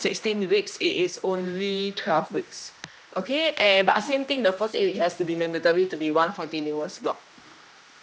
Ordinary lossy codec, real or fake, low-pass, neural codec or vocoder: none; fake; none; codec, 16 kHz, 1 kbps, X-Codec, HuBERT features, trained on general audio